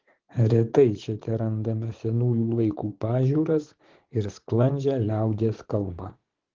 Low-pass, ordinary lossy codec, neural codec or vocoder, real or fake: 7.2 kHz; Opus, 16 kbps; vocoder, 44.1 kHz, 80 mel bands, Vocos; fake